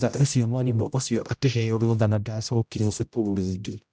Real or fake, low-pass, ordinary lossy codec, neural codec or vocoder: fake; none; none; codec, 16 kHz, 0.5 kbps, X-Codec, HuBERT features, trained on general audio